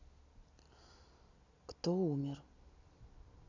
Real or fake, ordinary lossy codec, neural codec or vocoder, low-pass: real; none; none; 7.2 kHz